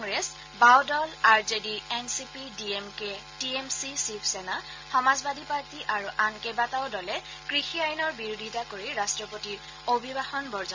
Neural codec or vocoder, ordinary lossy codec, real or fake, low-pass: none; MP3, 48 kbps; real; 7.2 kHz